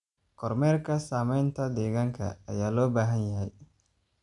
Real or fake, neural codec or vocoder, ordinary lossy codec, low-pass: real; none; none; 10.8 kHz